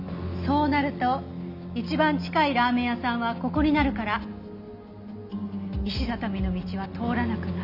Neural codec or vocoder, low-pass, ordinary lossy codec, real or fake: none; 5.4 kHz; none; real